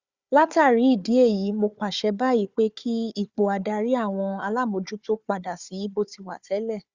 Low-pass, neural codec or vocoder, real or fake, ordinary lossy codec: 7.2 kHz; codec, 16 kHz, 4 kbps, FunCodec, trained on Chinese and English, 50 frames a second; fake; Opus, 64 kbps